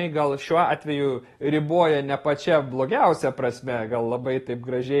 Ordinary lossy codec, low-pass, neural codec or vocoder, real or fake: AAC, 32 kbps; 19.8 kHz; none; real